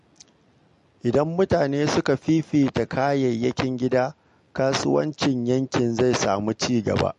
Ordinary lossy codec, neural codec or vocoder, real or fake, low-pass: MP3, 48 kbps; none; real; 14.4 kHz